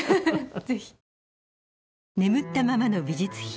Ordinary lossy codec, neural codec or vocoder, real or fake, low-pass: none; none; real; none